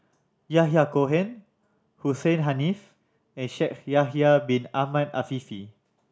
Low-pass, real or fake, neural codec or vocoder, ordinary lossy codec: none; real; none; none